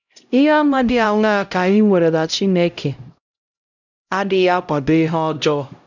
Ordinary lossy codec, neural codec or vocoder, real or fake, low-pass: none; codec, 16 kHz, 0.5 kbps, X-Codec, HuBERT features, trained on LibriSpeech; fake; 7.2 kHz